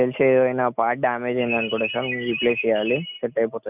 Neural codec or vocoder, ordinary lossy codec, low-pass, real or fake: none; none; 3.6 kHz; real